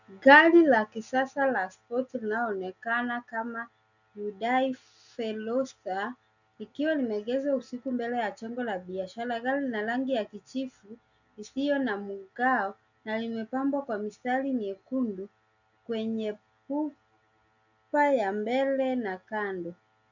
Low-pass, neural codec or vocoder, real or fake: 7.2 kHz; none; real